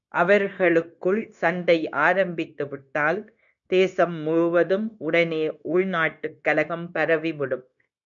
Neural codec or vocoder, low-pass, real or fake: codec, 16 kHz, 0.9 kbps, LongCat-Audio-Codec; 7.2 kHz; fake